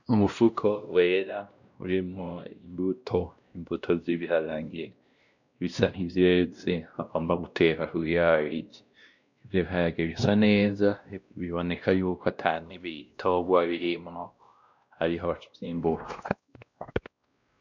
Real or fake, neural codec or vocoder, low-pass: fake; codec, 16 kHz, 1 kbps, X-Codec, WavLM features, trained on Multilingual LibriSpeech; 7.2 kHz